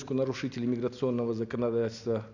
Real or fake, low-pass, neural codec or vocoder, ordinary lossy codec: real; 7.2 kHz; none; none